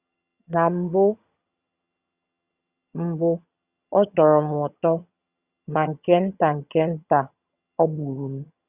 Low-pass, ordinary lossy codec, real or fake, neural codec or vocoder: 3.6 kHz; Opus, 64 kbps; fake; vocoder, 22.05 kHz, 80 mel bands, HiFi-GAN